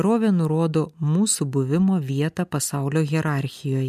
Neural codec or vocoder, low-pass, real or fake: none; 14.4 kHz; real